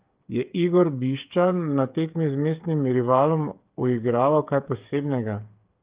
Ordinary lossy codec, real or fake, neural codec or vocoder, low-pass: Opus, 32 kbps; fake; codec, 16 kHz, 8 kbps, FreqCodec, smaller model; 3.6 kHz